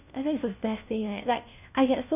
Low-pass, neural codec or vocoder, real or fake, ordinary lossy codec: 3.6 kHz; codec, 16 kHz, 1 kbps, FunCodec, trained on LibriTTS, 50 frames a second; fake; none